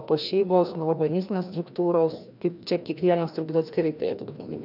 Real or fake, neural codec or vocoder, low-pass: fake; codec, 16 kHz, 1 kbps, FreqCodec, larger model; 5.4 kHz